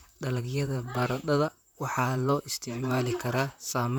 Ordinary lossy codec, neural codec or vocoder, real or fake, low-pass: none; vocoder, 44.1 kHz, 128 mel bands, Pupu-Vocoder; fake; none